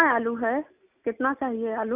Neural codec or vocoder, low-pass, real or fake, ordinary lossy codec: none; 3.6 kHz; real; none